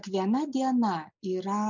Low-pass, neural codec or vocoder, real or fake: 7.2 kHz; none; real